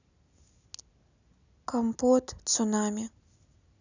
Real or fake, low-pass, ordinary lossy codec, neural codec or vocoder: real; 7.2 kHz; none; none